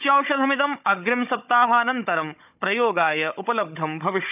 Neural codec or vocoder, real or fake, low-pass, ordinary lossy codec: codec, 16 kHz, 16 kbps, FunCodec, trained on Chinese and English, 50 frames a second; fake; 3.6 kHz; none